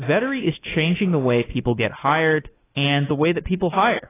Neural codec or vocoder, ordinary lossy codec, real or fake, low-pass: autoencoder, 48 kHz, 32 numbers a frame, DAC-VAE, trained on Japanese speech; AAC, 16 kbps; fake; 3.6 kHz